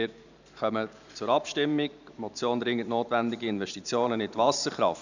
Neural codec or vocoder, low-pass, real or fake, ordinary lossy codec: vocoder, 44.1 kHz, 128 mel bands every 512 samples, BigVGAN v2; 7.2 kHz; fake; none